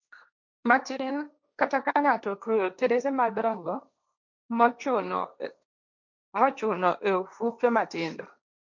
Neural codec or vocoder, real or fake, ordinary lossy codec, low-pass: codec, 16 kHz, 1.1 kbps, Voila-Tokenizer; fake; MP3, 64 kbps; 7.2 kHz